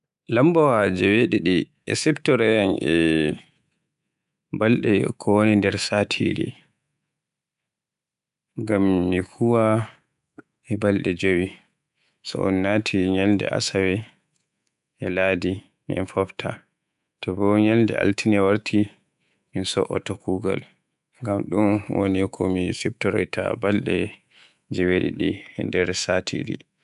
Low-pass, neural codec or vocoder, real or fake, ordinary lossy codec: 10.8 kHz; codec, 24 kHz, 3.1 kbps, DualCodec; fake; none